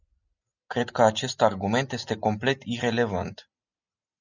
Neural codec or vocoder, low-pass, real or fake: none; 7.2 kHz; real